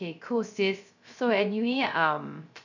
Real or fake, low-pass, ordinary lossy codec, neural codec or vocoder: fake; 7.2 kHz; none; codec, 16 kHz, 0.3 kbps, FocalCodec